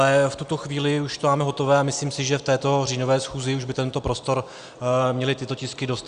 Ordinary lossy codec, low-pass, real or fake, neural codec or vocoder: Opus, 64 kbps; 9.9 kHz; real; none